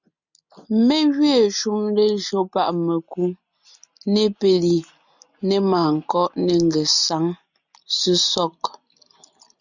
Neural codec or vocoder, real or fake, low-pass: none; real; 7.2 kHz